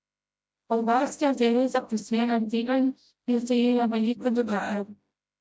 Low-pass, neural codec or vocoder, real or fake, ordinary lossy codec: none; codec, 16 kHz, 0.5 kbps, FreqCodec, smaller model; fake; none